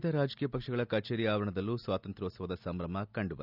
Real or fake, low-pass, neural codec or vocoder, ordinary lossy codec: real; 5.4 kHz; none; none